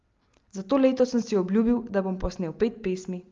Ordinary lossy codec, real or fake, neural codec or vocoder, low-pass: Opus, 32 kbps; real; none; 7.2 kHz